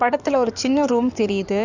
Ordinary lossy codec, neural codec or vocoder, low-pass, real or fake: none; codec, 16 kHz in and 24 kHz out, 2.2 kbps, FireRedTTS-2 codec; 7.2 kHz; fake